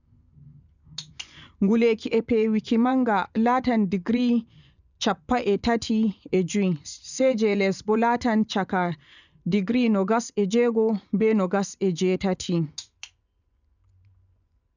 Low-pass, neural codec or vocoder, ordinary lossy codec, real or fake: 7.2 kHz; vocoder, 24 kHz, 100 mel bands, Vocos; none; fake